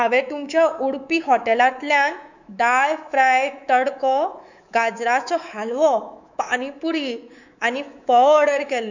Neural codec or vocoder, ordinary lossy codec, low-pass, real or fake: codec, 24 kHz, 3.1 kbps, DualCodec; none; 7.2 kHz; fake